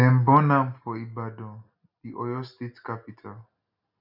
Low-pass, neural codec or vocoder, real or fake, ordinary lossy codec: 5.4 kHz; none; real; none